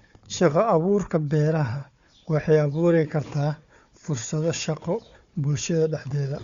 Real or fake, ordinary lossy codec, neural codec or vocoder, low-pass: fake; Opus, 64 kbps; codec, 16 kHz, 4 kbps, FunCodec, trained on Chinese and English, 50 frames a second; 7.2 kHz